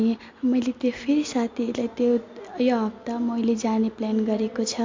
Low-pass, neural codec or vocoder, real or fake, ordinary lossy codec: 7.2 kHz; none; real; MP3, 48 kbps